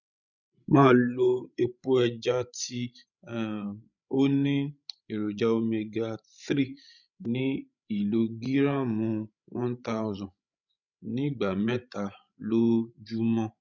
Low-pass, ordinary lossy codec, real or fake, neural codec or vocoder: 7.2 kHz; none; fake; codec, 16 kHz, 16 kbps, FreqCodec, larger model